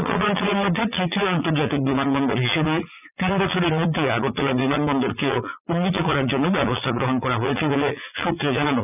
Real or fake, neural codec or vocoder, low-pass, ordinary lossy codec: fake; vocoder, 44.1 kHz, 80 mel bands, Vocos; 3.6 kHz; none